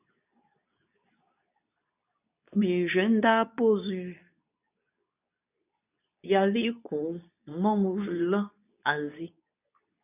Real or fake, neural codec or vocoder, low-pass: fake; codec, 24 kHz, 0.9 kbps, WavTokenizer, medium speech release version 2; 3.6 kHz